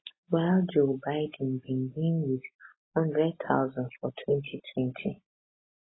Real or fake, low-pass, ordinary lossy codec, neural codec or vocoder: real; 7.2 kHz; AAC, 16 kbps; none